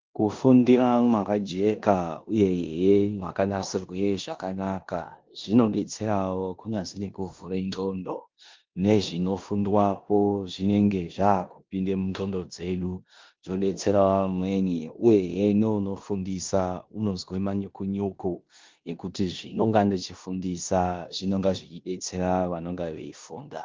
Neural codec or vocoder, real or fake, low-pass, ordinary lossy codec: codec, 16 kHz in and 24 kHz out, 0.9 kbps, LongCat-Audio-Codec, four codebook decoder; fake; 7.2 kHz; Opus, 32 kbps